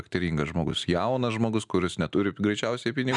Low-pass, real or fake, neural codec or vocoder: 10.8 kHz; real; none